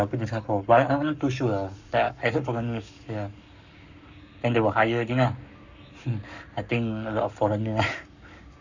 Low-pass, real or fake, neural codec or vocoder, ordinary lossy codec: 7.2 kHz; fake; codec, 44.1 kHz, 7.8 kbps, Pupu-Codec; none